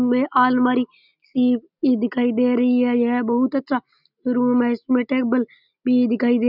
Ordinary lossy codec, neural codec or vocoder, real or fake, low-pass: none; none; real; 5.4 kHz